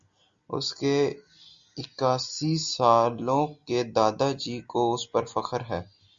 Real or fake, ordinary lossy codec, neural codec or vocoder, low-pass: real; Opus, 64 kbps; none; 7.2 kHz